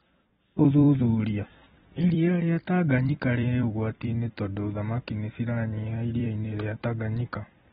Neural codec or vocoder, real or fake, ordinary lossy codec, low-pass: vocoder, 44.1 kHz, 128 mel bands every 256 samples, BigVGAN v2; fake; AAC, 16 kbps; 19.8 kHz